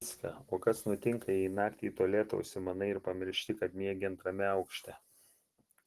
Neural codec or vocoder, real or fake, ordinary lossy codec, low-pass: none; real; Opus, 16 kbps; 14.4 kHz